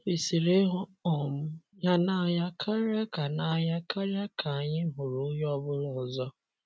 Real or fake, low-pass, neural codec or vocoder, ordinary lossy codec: real; none; none; none